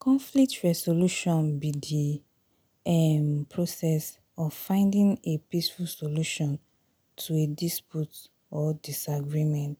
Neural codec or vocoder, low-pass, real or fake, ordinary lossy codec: none; none; real; none